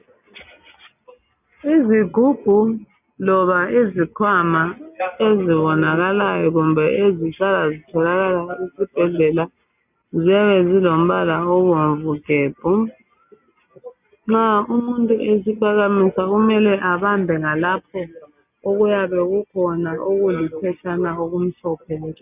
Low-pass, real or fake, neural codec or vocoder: 3.6 kHz; real; none